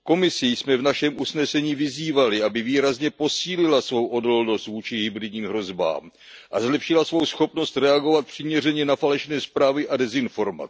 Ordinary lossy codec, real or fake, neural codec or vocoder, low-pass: none; real; none; none